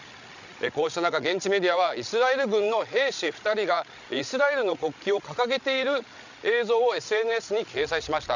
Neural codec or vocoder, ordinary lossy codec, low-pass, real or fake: codec, 16 kHz, 16 kbps, FreqCodec, larger model; none; 7.2 kHz; fake